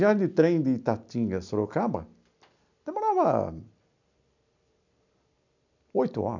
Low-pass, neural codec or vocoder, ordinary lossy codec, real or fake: 7.2 kHz; none; none; real